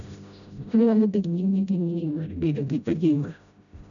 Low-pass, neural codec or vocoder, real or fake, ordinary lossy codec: 7.2 kHz; codec, 16 kHz, 0.5 kbps, FreqCodec, smaller model; fake; none